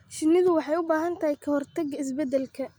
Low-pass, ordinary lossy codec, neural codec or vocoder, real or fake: none; none; none; real